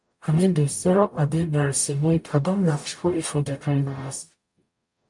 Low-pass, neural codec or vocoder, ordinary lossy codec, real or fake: 10.8 kHz; codec, 44.1 kHz, 0.9 kbps, DAC; MP3, 64 kbps; fake